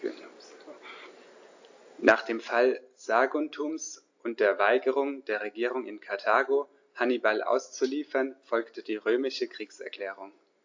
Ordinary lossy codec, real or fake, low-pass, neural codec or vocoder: AAC, 48 kbps; real; 7.2 kHz; none